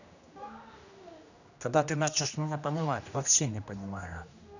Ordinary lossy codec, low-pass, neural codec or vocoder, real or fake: none; 7.2 kHz; codec, 16 kHz, 1 kbps, X-Codec, HuBERT features, trained on general audio; fake